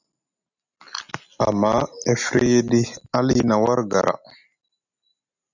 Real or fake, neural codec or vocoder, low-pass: real; none; 7.2 kHz